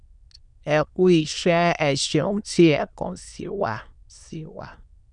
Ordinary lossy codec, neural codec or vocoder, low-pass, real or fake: none; autoencoder, 22.05 kHz, a latent of 192 numbers a frame, VITS, trained on many speakers; 9.9 kHz; fake